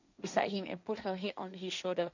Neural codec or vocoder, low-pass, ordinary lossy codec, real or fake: codec, 16 kHz, 1.1 kbps, Voila-Tokenizer; 7.2 kHz; none; fake